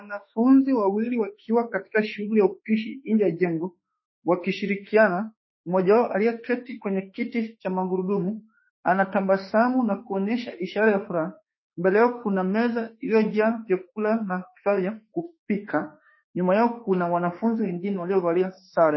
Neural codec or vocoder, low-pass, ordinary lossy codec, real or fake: autoencoder, 48 kHz, 32 numbers a frame, DAC-VAE, trained on Japanese speech; 7.2 kHz; MP3, 24 kbps; fake